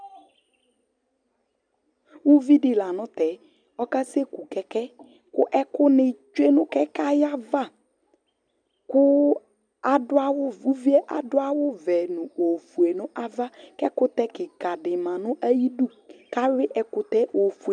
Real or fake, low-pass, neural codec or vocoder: real; 9.9 kHz; none